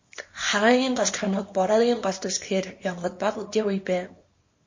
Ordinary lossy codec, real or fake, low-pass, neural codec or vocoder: MP3, 32 kbps; fake; 7.2 kHz; codec, 24 kHz, 0.9 kbps, WavTokenizer, small release